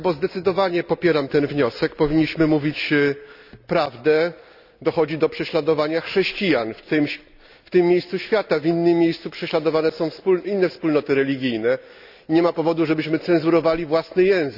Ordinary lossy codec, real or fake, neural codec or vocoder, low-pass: none; real; none; 5.4 kHz